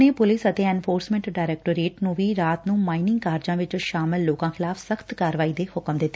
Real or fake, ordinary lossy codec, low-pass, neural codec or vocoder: real; none; none; none